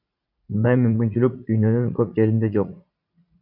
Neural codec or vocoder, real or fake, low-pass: vocoder, 44.1 kHz, 80 mel bands, Vocos; fake; 5.4 kHz